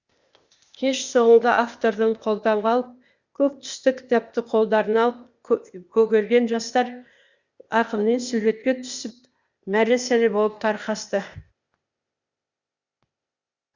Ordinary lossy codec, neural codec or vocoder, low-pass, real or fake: Opus, 64 kbps; codec, 16 kHz, 0.8 kbps, ZipCodec; 7.2 kHz; fake